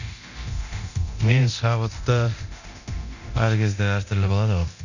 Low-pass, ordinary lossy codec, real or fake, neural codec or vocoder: 7.2 kHz; none; fake; codec, 24 kHz, 0.9 kbps, DualCodec